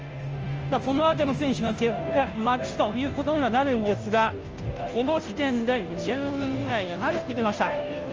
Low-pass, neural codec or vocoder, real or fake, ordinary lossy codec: 7.2 kHz; codec, 16 kHz, 0.5 kbps, FunCodec, trained on Chinese and English, 25 frames a second; fake; Opus, 24 kbps